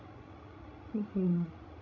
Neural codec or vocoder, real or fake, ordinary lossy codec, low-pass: codec, 16 kHz, 16 kbps, FreqCodec, larger model; fake; none; 7.2 kHz